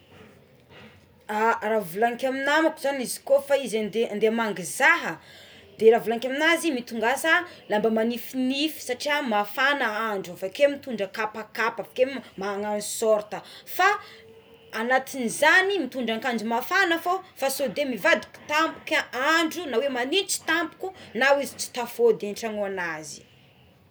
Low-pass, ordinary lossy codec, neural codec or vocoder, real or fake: none; none; none; real